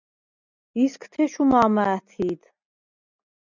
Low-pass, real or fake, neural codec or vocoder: 7.2 kHz; real; none